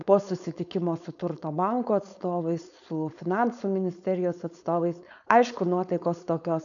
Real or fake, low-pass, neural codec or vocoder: fake; 7.2 kHz; codec, 16 kHz, 4.8 kbps, FACodec